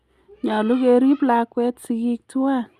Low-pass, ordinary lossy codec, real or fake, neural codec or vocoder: 14.4 kHz; Opus, 64 kbps; real; none